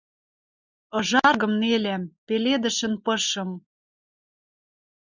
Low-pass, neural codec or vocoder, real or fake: 7.2 kHz; none; real